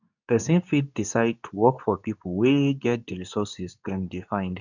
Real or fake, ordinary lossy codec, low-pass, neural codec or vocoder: fake; none; 7.2 kHz; codec, 24 kHz, 0.9 kbps, WavTokenizer, medium speech release version 2